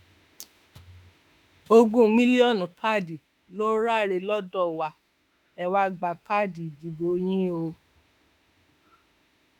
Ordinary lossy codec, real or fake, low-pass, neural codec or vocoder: none; fake; 19.8 kHz; autoencoder, 48 kHz, 32 numbers a frame, DAC-VAE, trained on Japanese speech